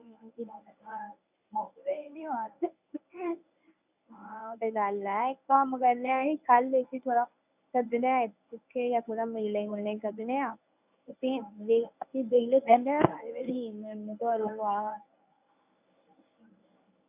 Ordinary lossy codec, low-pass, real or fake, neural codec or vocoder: none; 3.6 kHz; fake; codec, 24 kHz, 0.9 kbps, WavTokenizer, medium speech release version 1